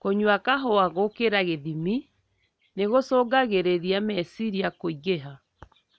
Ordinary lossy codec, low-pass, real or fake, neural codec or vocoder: none; none; real; none